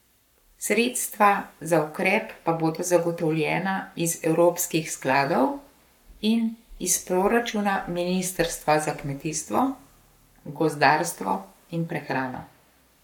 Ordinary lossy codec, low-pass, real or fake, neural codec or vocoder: none; 19.8 kHz; fake; codec, 44.1 kHz, 7.8 kbps, Pupu-Codec